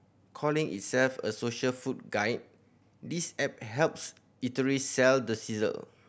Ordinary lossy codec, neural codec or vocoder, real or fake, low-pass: none; none; real; none